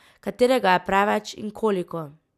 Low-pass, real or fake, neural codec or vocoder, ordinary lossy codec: 14.4 kHz; real; none; none